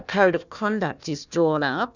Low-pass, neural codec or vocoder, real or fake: 7.2 kHz; codec, 16 kHz, 1 kbps, FunCodec, trained on Chinese and English, 50 frames a second; fake